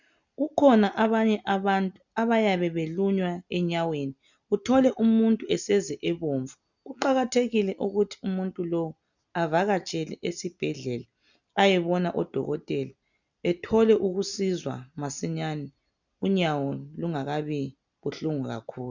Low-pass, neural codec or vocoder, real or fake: 7.2 kHz; none; real